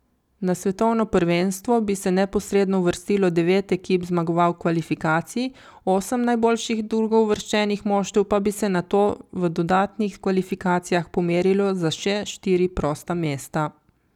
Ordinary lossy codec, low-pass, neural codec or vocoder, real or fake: none; 19.8 kHz; none; real